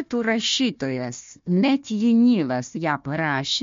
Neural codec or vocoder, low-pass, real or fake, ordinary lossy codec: codec, 16 kHz, 1 kbps, FunCodec, trained on Chinese and English, 50 frames a second; 7.2 kHz; fake; MP3, 48 kbps